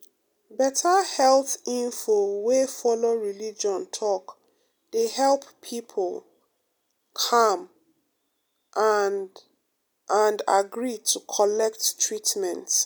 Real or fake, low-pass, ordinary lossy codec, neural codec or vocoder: real; none; none; none